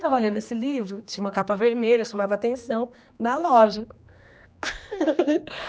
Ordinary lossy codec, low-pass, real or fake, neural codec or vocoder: none; none; fake; codec, 16 kHz, 2 kbps, X-Codec, HuBERT features, trained on general audio